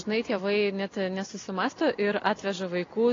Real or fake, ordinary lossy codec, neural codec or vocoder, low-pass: real; AAC, 32 kbps; none; 7.2 kHz